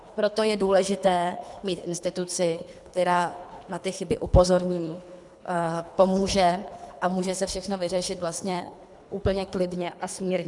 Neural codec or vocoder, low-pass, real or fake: codec, 24 kHz, 3 kbps, HILCodec; 10.8 kHz; fake